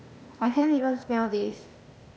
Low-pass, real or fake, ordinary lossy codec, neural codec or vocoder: none; fake; none; codec, 16 kHz, 0.8 kbps, ZipCodec